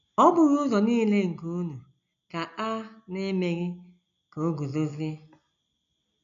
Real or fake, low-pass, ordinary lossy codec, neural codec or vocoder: real; 7.2 kHz; none; none